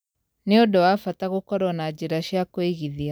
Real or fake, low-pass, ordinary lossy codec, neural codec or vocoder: real; none; none; none